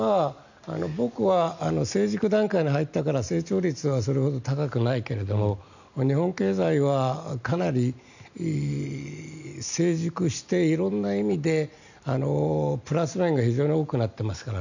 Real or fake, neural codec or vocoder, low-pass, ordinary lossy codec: real; none; 7.2 kHz; none